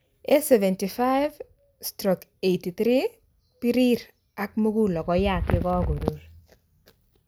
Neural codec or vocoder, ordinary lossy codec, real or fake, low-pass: none; none; real; none